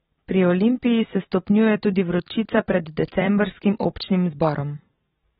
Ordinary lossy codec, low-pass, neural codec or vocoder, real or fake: AAC, 16 kbps; 19.8 kHz; vocoder, 44.1 kHz, 128 mel bands every 256 samples, BigVGAN v2; fake